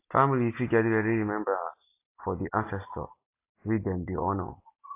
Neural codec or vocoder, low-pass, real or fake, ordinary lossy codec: none; 3.6 kHz; real; AAC, 24 kbps